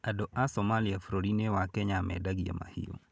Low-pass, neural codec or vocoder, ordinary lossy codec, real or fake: none; none; none; real